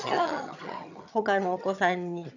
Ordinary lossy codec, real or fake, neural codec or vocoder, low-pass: none; fake; vocoder, 22.05 kHz, 80 mel bands, HiFi-GAN; 7.2 kHz